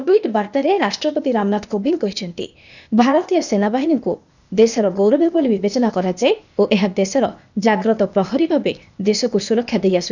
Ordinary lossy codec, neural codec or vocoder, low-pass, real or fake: none; codec, 16 kHz, 0.8 kbps, ZipCodec; 7.2 kHz; fake